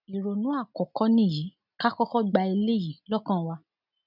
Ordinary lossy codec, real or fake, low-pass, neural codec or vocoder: none; real; 5.4 kHz; none